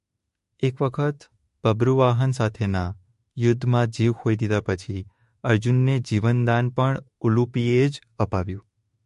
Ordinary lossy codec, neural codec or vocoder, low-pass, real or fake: MP3, 48 kbps; autoencoder, 48 kHz, 32 numbers a frame, DAC-VAE, trained on Japanese speech; 14.4 kHz; fake